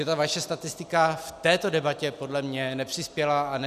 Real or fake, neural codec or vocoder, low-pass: real; none; 14.4 kHz